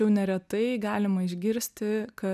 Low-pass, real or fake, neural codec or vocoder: 14.4 kHz; real; none